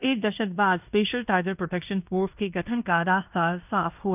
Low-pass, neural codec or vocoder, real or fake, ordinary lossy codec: 3.6 kHz; codec, 16 kHz in and 24 kHz out, 0.9 kbps, LongCat-Audio-Codec, fine tuned four codebook decoder; fake; none